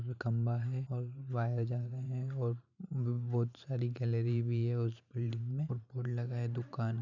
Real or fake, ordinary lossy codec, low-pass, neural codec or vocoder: fake; none; 7.2 kHz; vocoder, 44.1 kHz, 128 mel bands every 512 samples, BigVGAN v2